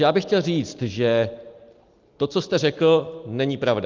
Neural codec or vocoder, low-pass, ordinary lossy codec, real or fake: none; 7.2 kHz; Opus, 32 kbps; real